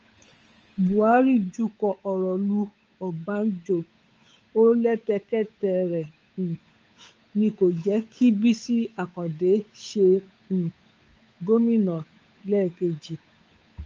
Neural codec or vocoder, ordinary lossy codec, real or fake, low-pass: codec, 16 kHz, 8 kbps, FunCodec, trained on Chinese and English, 25 frames a second; Opus, 24 kbps; fake; 7.2 kHz